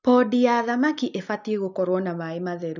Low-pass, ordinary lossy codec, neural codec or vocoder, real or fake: 7.2 kHz; none; none; real